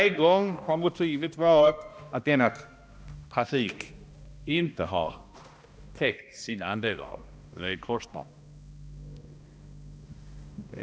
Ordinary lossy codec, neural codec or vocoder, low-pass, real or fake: none; codec, 16 kHz, 1 kbps, X-Codec, HuBERT features, trained on balanced general audio; none; fake